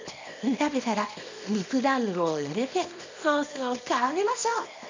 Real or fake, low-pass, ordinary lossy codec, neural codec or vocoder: fake; 7.2 kHz; MP3, 64 kbps; codec, 24 kHz, 0.9 kbps, WavTokenizer, small release